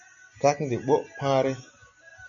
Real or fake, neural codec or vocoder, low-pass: real; none; 7.2 kHz